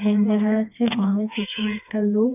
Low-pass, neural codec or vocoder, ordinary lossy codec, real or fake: 3.6 kHz; codec, 16 kHz, 2 kbps, FreqCodec, smaller model; none; fake